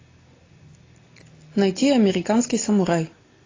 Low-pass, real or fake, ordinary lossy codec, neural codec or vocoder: 7.2 kHz; real; AAC, 32 kbps; none